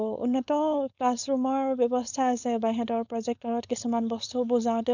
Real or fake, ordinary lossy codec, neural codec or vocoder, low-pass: fake; none; codec, 16 kHz, 4.8 kbps, FACodec; 7.2 kHz